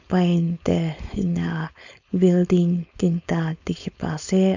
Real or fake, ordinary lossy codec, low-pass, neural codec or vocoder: fake; none; 7.2 kHz; codec, 16 kHz, 4.8 kbps, FACodec